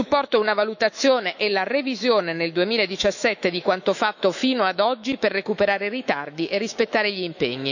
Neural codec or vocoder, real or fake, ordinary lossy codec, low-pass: autoencoder, 48 kHz, 128 numbers a frame, DAC-VAE, trained on Japanese speech; fake; none; 7.2 kHz